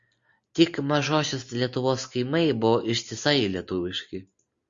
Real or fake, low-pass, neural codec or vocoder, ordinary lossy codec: real; 7.2 kHz; none; Opus, 64 kbps